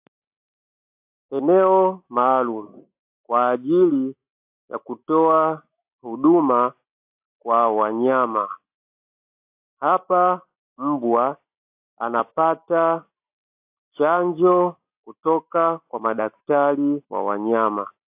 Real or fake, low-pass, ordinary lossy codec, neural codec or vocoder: real; 3.6 kHz; AAC, 32 kbps; none